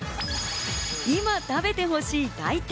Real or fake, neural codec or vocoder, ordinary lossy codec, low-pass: real; none; none; none